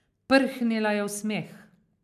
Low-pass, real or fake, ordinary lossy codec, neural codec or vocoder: 14.4 kHz; real; AAC, 96 kbps; none